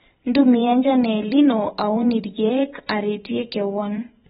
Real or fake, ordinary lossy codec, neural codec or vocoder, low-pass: fake; AAC, 16 kbps; vocoder, 44.1 kHz, 128 mel bands every 512 samples, BigVGAN v2; 19.8 kHz